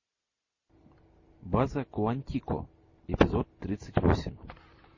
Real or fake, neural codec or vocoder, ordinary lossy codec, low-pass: real; none; MP3, 32 kbps; 7.2 kHz